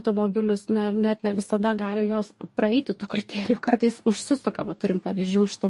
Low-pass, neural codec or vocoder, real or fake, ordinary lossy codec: 14.4 kHz; codec, 44.1 kHz, 2.6 kbps, DAC; fake; MP3, 48 kbps